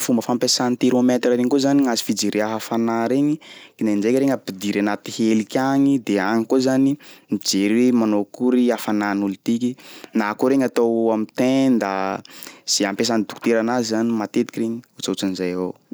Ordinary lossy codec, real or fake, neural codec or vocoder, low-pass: none; real; none; none